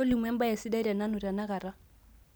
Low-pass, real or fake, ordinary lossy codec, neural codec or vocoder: none; real; none; none